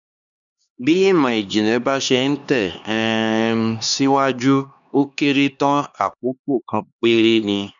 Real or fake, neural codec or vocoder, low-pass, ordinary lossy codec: fake; codec, 16 kHz, 2 kbps, X-Codec, HuBERT features, trained on LibriSpeech; 7.2 kHz; none